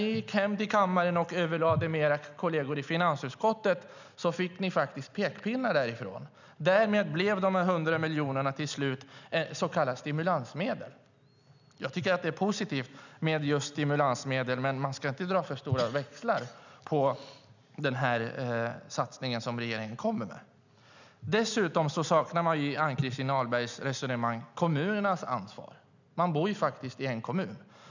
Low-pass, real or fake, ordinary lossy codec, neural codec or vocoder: 7.2 kHz; real; none; none